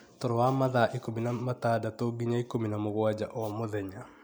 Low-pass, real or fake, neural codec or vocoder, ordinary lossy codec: none; real; none; none